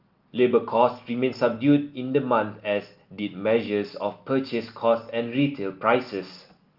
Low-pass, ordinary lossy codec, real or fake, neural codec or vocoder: 5.4 kHz; Opus, 32 kbps; real; none